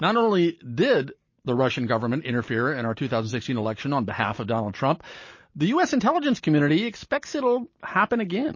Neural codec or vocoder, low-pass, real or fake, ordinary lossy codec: none; 7.2 kHz; real; MP3, 32 kbps